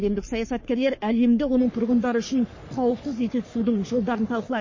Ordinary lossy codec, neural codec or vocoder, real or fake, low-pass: MP3, 32 kbps; codec, 44.1 kHz, 3.4 kbps, Pupu-Codec; fake; 7.2 kHz